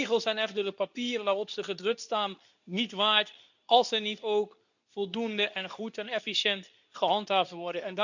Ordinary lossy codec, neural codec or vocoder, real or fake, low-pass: none; codec, 24 kHz, 0.9 kbps, WavTokenizer, medium speech release version 2; fake; 7.2 kHz